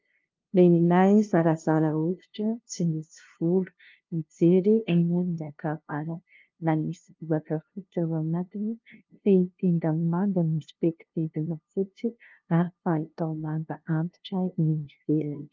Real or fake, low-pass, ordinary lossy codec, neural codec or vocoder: fake; 7.2 kHz; Opus, 24 kbps; codec, 16 kHz, 0.5 kbps, FunCodec, trained on LibriTTS, 25 frames a second